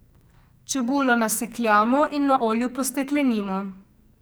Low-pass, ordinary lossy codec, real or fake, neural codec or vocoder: none; none; fake; codec, 44.1 kHz, 2.6 kbps, SNAC